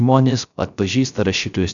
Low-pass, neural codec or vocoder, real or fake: 7.2 kHz; codec, 16 kHz, 0.3 kbps, FocalCodec; fake